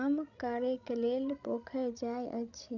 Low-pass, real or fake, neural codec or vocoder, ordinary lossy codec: 7.2 kHz; fake; codec, 16 kHz, 16 kbps, FreqCodec, larger model; none